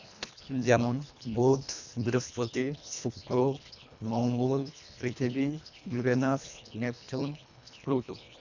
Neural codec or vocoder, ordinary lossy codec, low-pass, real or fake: codec, 24 kHz, 1.5 kbps, HILCodec; none; 7.2 kHz; fake